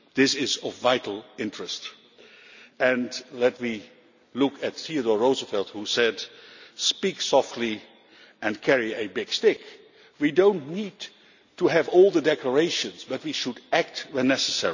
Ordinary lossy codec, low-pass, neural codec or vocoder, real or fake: none; 7.2 kHz; none; real